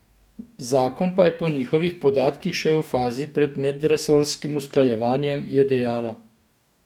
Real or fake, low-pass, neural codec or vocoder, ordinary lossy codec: fake; 19.8 kHz; codec, 44.1 kHz, 2.6 kbps, DAC; none